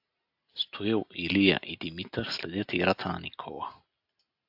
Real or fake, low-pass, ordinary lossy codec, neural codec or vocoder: real; 5.4 kHz; AAC, 48 kbps; none